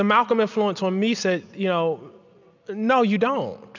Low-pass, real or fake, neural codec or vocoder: 7.2 kHz; real; none